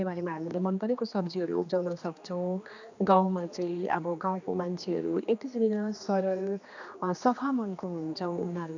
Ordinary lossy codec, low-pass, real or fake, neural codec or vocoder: none; 7.2 kHz; fake; codec, 16 kHz, 2 kbps, X-Codec, HuBERT features, trained on general audio